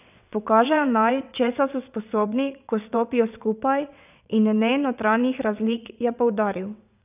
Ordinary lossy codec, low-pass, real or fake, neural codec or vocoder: none; 3.6 kHz; fake; vocoder, 22.05 kHz, 80 mel bands, WaveNeXt